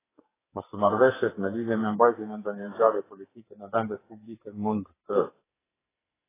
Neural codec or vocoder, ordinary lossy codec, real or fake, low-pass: codec, 44.1 kHz, 2.6 kbps, SNAC; AAC, 16 kbps; fake; 3.6 kHz